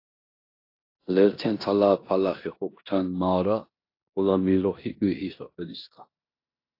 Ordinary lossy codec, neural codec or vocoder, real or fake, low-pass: AAC, 32 kbps; codec, 16 kHz in and 24 kHz out, 0.9 kbps, LongCat-Audio-Codec, four codebook decoder; fake; 5.4 kHz